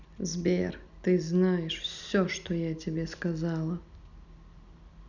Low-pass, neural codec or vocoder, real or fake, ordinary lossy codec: 7.2 kHz; none; real; none